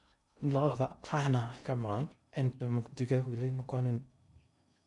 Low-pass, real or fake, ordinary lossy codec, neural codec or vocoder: 10.8 kHz; fake; none; codec, 16 kHz in and 24 kHz out, 0.6 kbps, FocalCodec, streaming, 2048 codes